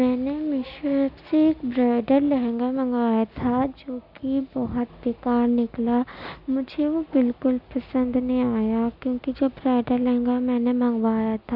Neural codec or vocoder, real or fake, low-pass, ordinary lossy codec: none; real; 5.4 kHz; none